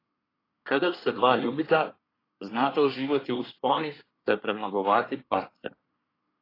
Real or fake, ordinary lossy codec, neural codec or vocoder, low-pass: fake; AAC, 24 kbps; codec, 24 kHz, 1 kbps, SNAC; 5.4 kHz